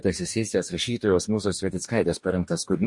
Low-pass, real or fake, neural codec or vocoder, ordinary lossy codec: 10.8 kHz; fake; codec, 44.1 kHz, 2.6 kbps, DAC; MP3, 48 kbps